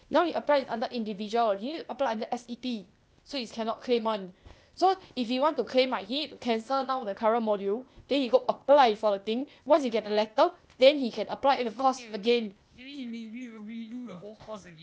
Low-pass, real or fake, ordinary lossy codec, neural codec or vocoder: none; fake; none; codec, 16 kHz, 0.8 kbps, ZipCodec